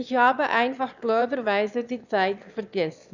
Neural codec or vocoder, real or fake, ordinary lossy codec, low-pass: autoencoder, 22.05 kHz, a latent of 192 numbers a frame, VITS, trained on one speaker; fake; none; 7.2 kHz